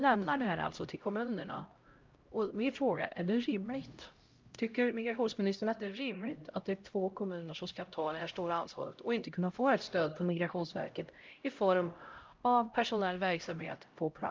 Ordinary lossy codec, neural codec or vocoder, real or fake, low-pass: Opus, 24 kbps; codec, 16 kHz, 0.5 kbps, X-Codec, HuBERT features, trained on LibriSpeech; fake; 7.2 kHz